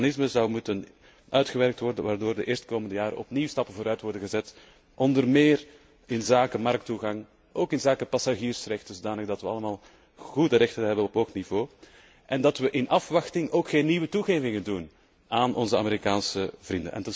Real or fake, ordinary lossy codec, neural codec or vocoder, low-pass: real; none; none; none